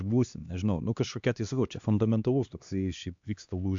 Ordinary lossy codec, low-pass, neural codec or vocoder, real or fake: AAC, 64 kbps; 7.2 kHz; codec, 16 kHz, 2 kbps, X-Codec, HuBERT features, trained on LibriSpeech; fake